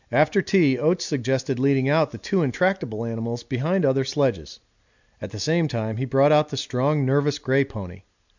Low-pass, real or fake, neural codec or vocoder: 7.2 kHz; real; none